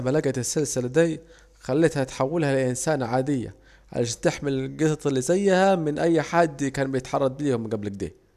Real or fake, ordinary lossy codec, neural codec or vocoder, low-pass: real; none; none; 14.4 kHz